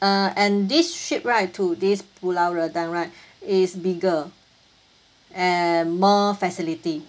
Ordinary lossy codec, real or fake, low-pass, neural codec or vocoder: none; real; none; none